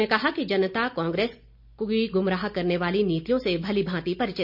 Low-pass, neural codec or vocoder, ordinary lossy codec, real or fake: 5.4 kHz; none; none; real